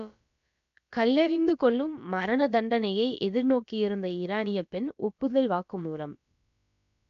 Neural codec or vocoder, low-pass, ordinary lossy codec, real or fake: codec, 16 kHz, about 1 kbps, DyCAST, with the encoder's durations; 7.2 kHz; none; fake